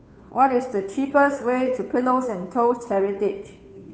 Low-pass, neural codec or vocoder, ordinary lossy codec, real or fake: none; codec, 16 kHz, 2 kbps, FunCodec, trained on Chinese and English, 25 frames a second; none; fake